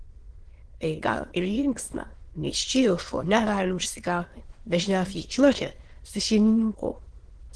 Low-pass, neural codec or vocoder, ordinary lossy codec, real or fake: 9.9 kHz; autoencoder, 22.05 kHz, a latent of 192 numbers a frame, VITS, trained on many speakers; Opus, 16 kbps; fake